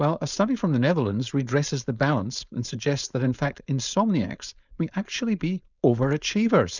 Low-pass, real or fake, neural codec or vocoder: 7.2 kHz; fake; codec, 16 kHz, 4.8 kbps, FACodec